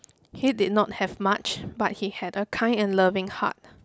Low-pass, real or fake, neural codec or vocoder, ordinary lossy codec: none; real; none; none